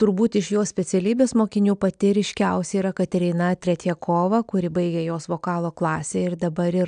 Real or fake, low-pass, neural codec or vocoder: real; 9.9 kHz; none